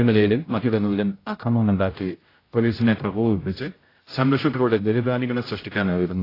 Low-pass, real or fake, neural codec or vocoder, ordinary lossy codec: 5.4 kHz; fake; codec, 16 kHz, 0.5 kbps, X-Codec, HuBERT features, trained on general audio; AAC, 24 kbps